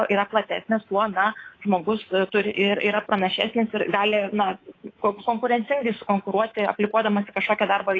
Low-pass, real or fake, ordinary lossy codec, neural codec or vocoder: 7.2 kHz; fake; AAC, 32 kbps; codec, 24 kHz, 3.1 kbps, DualCodec